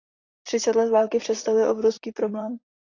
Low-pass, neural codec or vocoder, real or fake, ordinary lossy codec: 7.2 kHz; vocoder, 44.1 kHz, 128 mel bands, Pupu-Vocoder; fake; AAC, 32 kbps